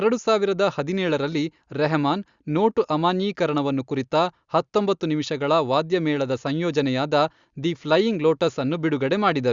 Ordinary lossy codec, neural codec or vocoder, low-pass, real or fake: Opus, 64 kbps; none; 7.2 kHz; real